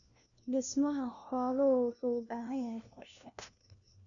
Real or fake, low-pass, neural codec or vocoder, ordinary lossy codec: fake; 7.2 kHz; codec, 16 kHz, 1 kbps, FunCodec, trained on LibriTTS, 50 frames a second; none